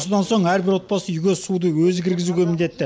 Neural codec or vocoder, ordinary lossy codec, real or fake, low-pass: none; none; real; none